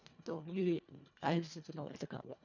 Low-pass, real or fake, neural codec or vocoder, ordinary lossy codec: 7.2 kHz; fake; codec, 24 kHz, 1.5 kbps, HILCodec; none